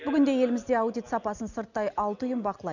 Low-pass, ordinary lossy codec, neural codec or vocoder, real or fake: 7.2 kHz; none; none; real